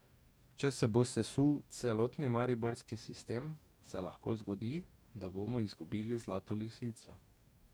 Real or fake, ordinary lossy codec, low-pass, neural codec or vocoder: fake; none; none; codec, 44.1 kHz, 2.6 kbps, DAC